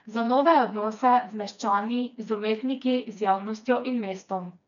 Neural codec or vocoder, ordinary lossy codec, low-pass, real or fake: codec, 16 kHz, 2 kbps, FreqCodec, smaller model; none; 7.2 kHz; fake